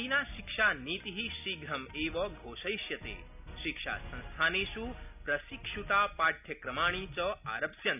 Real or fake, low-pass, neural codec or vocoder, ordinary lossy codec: real; 3.6 kHz; none; none